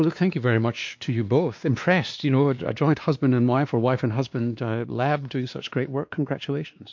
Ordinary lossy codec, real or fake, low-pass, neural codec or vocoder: MP3, 48 kbps; fake; 7.2 kHz; codec, 16 kHz, 2 kbps, X-Codec, WavLM features, trained on Multilingual LibriSpeech